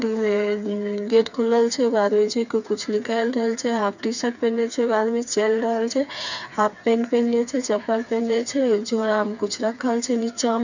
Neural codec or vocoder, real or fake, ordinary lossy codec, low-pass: codec, 16 kHz, 4 kbps, FreqCodec, smaller model; fake; none; 7.2 kHz